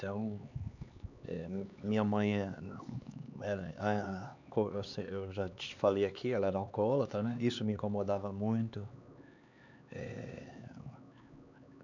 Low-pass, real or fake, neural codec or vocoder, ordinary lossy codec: 7.2 kHz; fake; codec, 16 kHz, 4 kbps, X-Codec, HuBERT features, trained on LibriSpeech; none